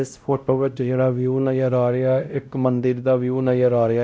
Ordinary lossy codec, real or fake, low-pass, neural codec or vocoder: none; fake; none; codec, 16 kHz, 0.5 kbps, X-Codec, WavLM features, trained on Multilingual LibriSpeech